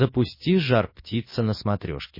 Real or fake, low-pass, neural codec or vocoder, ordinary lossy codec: real; 5.4 kHz; none; MP3, 24 kbps